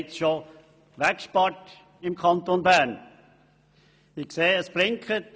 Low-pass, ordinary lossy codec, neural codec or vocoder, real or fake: none; none; none; real